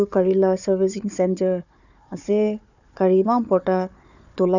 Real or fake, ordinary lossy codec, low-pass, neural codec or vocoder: fake; none; 7.2 kHz; codec, 16 kHz, 4 kbps, FunCodec, trained on Chinese and English, 50 frames a second